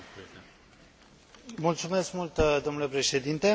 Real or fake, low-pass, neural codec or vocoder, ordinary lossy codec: real; none; none; none